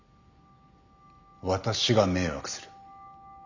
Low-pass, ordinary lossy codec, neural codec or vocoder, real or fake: 7.2 kHz; none; none; real